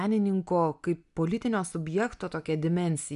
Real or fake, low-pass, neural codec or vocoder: real; 10.8 kHz; none